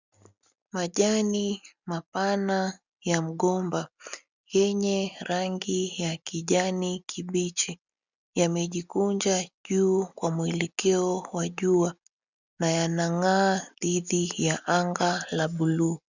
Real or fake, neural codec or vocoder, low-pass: real; none; 7.2 kHz